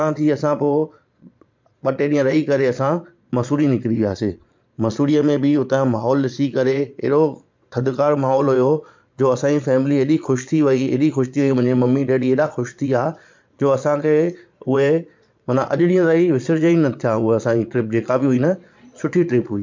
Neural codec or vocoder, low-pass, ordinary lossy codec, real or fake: vocoder, 22.05 kHz, 80 mel bands, Vocos; 7.2 kHz; MP3, 64 kbps; fake